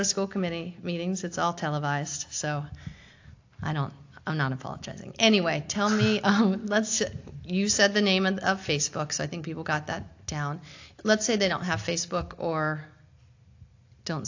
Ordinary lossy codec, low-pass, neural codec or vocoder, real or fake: AAC, 48 kbps; 7.2 kHz; none; real